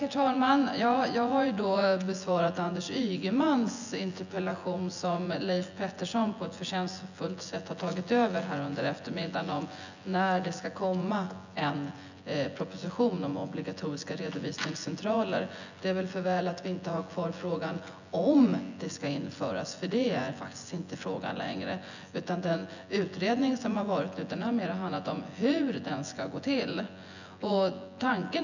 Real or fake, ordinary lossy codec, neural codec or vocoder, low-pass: fake; none; vocoder, 24 kHz, 100 mel bands, Vocos; 7.2 kHz